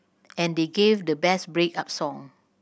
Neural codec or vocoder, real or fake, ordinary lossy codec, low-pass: none; real; none; none